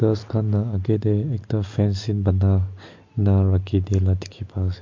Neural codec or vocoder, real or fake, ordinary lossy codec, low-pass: none; real; MP3, 48 kbps; 7.2 kHz